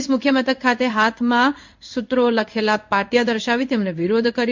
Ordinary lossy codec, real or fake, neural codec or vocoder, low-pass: MP3, 48 kbps; fake; codec, 16 kHz in and 24 kHz out, 1 kbps, XY-Tokenizer; 7.2 kHz